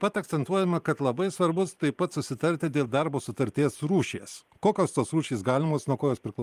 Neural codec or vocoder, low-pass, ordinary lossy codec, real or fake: vocoder, 44.1 kHz, 128 mel bands every 512 samples, BigVGAN v2; 14.4 kHz; Opus, 32 kbps; fake